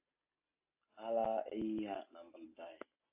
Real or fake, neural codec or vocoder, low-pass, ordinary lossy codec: real; none; 3.6 kHz; AAC, 32 kbps